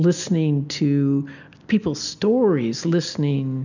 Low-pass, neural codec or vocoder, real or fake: 7.2 kHz; none; real